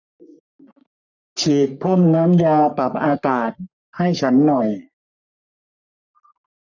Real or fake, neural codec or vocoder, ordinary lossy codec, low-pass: fake; codec, 44.1 kHz, 3.4 kbps, Pupu-Codec; none; 7.2 kHz